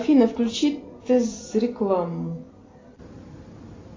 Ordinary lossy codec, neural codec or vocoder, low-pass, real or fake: AAC, 32 kbps; none; 7.2 kHz; real